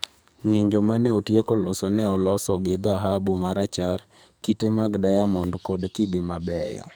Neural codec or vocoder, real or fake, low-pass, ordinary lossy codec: codec, 44.1 kHz, 2.6 kbps, SNAC; fake; none; none